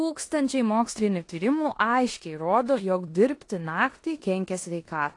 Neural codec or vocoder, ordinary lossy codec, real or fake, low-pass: codec, 16 kHz in and 24 kHz out, 0.9 kbps, LongCat-Audio-Codec, four codebook decoder; AAC, 48 kbps; fake; 10.8 kHz